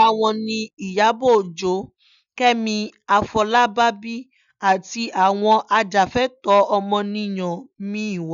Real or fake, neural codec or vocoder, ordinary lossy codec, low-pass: real; none; none; 7.2 kHz